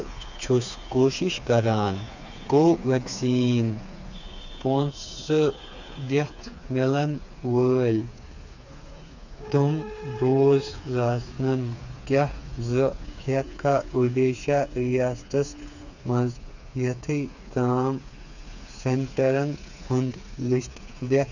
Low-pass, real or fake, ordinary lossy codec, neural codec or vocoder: 7.2 kHz; fake; none; codec, 16 kHz, 4 kbps, FreqCodec, smaller model